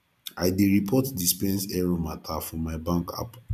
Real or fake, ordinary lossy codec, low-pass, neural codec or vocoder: fake; none; 14.4 kHz; vocoder, 44.1 kHz, 128 mel bands every 256 samples, BigVGAN v2